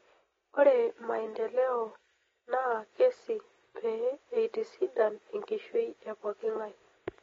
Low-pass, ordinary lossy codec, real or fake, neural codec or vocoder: 7.2 kHz; AAC, 24 kbps; real; none